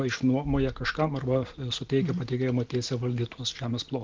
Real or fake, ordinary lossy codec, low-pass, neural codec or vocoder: real; Opus, 16 kbps; 7.2 kHz; none